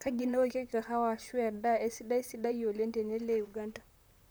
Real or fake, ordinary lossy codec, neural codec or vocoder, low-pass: fake; none; vocoder, 44.1 kHz, 128 mel bands, Pupu-Vocoder; none